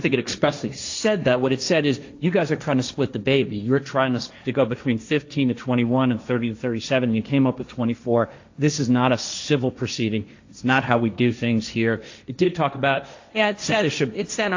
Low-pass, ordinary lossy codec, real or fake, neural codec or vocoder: 7.2 kHz; AAC, 48 kbps; fake; codec, 16 kHz, 1.1 kbps, Voila-Tokenizer